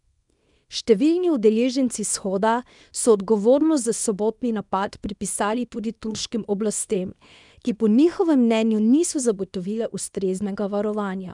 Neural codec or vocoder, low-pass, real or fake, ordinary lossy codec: codec, 24 kHz, 0.9 kbps, WavTokenizer, small release; 10.8 kHz; fake; none